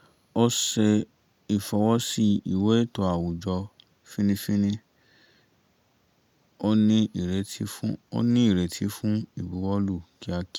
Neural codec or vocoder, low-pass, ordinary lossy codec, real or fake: none; 19.8 kHz; none; real